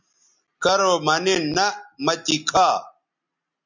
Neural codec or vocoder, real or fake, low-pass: none; real; 7.2 kHz